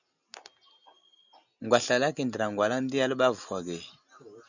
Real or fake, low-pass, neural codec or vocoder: real; 7.2 kHz; none